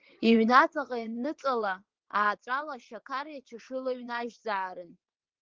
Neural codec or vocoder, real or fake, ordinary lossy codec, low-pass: vocoder, 22.05 kHz, 80 mel bands, WaveNeXt; fake; Opus, 16 kbps; 7.2 kHz